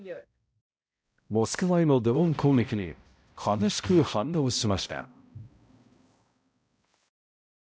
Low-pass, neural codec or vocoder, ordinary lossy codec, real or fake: none; codec, 16 kHz, 0.5 kbps, X-Codec, HuBERT features, trained on balanced general audio; none; fake